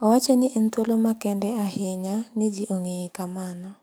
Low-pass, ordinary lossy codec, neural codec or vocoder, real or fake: none; none; codec, 44.1 kHz, 7.8 kbps, DAC; fake